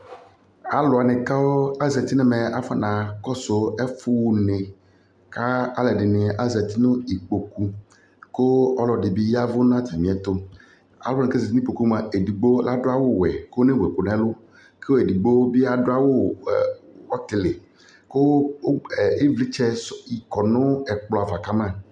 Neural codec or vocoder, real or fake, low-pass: none; real; 9.9 kHz